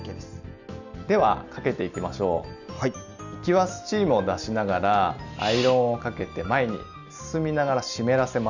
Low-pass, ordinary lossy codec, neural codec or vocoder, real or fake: 7.2 kHz; none; none; real